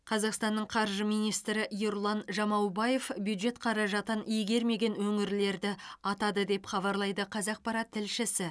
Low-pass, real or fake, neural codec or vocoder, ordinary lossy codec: none; real; none; none